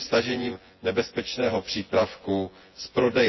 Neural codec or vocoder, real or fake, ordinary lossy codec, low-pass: vocoder, 24 kHz, 100 mel bands, Vocos; fake; MP3, 24 kbps; 7.2 kHz